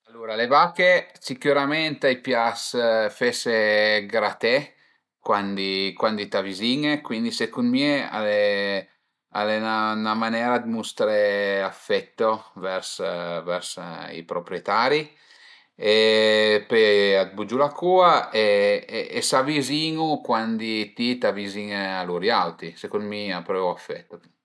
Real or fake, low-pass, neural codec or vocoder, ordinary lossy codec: real; none; none; none